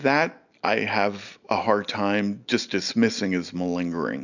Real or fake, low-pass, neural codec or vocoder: real; 7.2 kHz; none